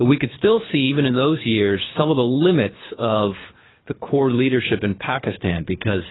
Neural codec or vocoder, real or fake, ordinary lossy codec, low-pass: codec, 24 kHz, 3 kbps, HILCodec; fake; AAC, 16 kbps; 7.2 kHz